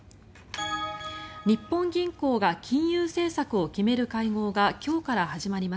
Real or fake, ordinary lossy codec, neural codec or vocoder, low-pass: real; none; none; none